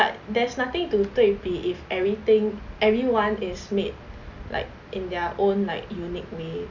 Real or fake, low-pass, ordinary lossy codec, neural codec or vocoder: real; 7.2 kHz; none; none